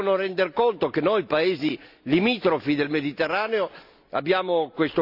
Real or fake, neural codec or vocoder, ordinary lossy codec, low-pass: real; none; none; 5.4 kHz